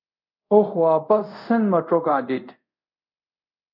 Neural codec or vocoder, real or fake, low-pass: codec, 24 kHz, 0.5 kbps, DualCodec; fake; 5.4 kHz